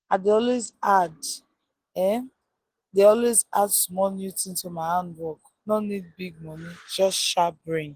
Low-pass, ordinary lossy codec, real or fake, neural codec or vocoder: 14.4 kHz; Opus, 16 kbps; real; none